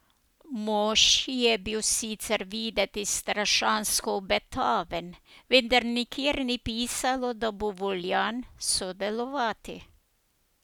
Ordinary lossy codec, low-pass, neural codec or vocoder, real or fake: none; none; none; real